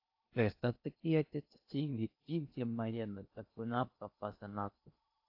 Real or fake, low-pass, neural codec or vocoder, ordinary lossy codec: fake; 5.4 kHz; codec, 16 kHz in and 24 kHz out, 0.6 kbps, FocalCodec, streaming, 2048 codes; AAC, 48 kbps